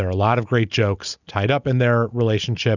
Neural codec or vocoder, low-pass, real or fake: none; 7.2 kHz; real